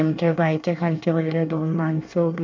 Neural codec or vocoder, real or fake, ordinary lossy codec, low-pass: codec, 24 kHz, 1 kbps, SNAC; fake; MP3, 64 kbps; 7.2 kHz